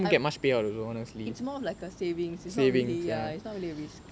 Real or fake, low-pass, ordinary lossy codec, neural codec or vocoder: real; none; none; none